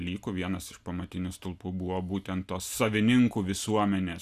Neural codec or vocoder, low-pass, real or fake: none; 14.4 kHz; real